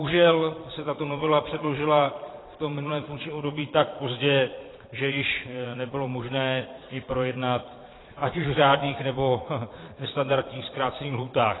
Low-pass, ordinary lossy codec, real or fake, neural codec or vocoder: 7.2 kHz; AAC, 16 kbps; fake; vocoder, 22.05 kHz, 80 mel bands, Vocos